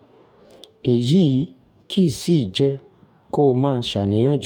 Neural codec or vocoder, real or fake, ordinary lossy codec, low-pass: codec, 44.1 kHz, 2.6 kbps, DAC; fake; none; 19.8 kHz